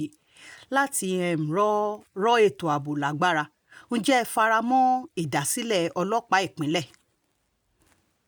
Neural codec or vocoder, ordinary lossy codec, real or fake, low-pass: none; none; real; none